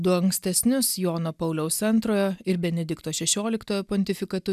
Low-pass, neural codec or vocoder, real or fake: 14.4 kHz; none; real